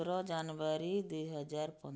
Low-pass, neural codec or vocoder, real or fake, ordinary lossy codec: none; none; real; none